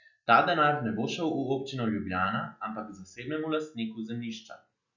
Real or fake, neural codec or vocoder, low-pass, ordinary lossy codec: real; none; 7.2 kHz; none